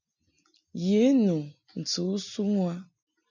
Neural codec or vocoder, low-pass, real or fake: none; 7.2 kHz; real